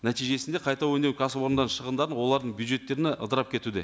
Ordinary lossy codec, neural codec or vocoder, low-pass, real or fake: none; none; none; real